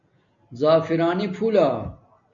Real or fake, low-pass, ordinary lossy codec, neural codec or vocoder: real; 7.2 kHz; MP3, 48 kbps; none